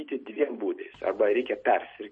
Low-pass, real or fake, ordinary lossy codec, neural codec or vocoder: 9.9 kHz; real; MP3, 32 kbps; none